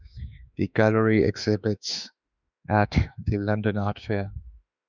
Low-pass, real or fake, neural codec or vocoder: 7.2 kHz; fake; autoencoder, 48 kHz, 32 numbers a frame, DAC-VAE, trained on Japanese speech